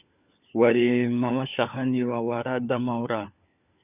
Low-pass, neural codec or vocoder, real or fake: 3.6 kHz; codec, 16 kHz, 4 kbps, FunCodec, trained on LibriTTS, 50 frames a second; fake